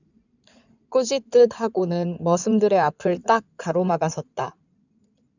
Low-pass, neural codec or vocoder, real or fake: 7.2 kHz; codec, 16 kHz in and 24 kHz out, 2.2 kbps, FireRedTTS-2 codec; fake